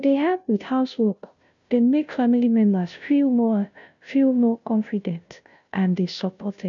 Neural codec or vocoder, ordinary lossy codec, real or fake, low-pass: codec, 16 kHz, 0.5 kbps, FunCodec, trained on Chinese and English, 25 frames a second; none; fake; 7.2 kHz